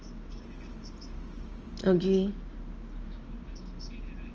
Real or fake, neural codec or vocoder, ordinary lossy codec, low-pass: real; none; Opus, 24 kbps; 7.2 kHz